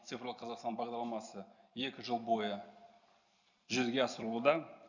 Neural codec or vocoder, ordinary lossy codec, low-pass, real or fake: none; none; 7.2 kHz; real